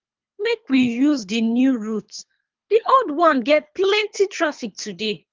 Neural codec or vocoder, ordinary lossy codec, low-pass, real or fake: codec, 24 kHz, 3 kbps, HILCodec; Opus, 24 kbps; 7.2 kHz; fake